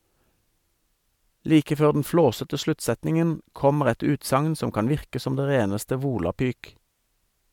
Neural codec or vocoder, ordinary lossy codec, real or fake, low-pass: none; MP3, 96 kbps; real; 19.8 kHz